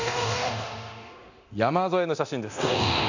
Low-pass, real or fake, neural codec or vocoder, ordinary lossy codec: 7.2 kHz; fake; codec, 24 kHz, 0.9 kbps, DualCodec; none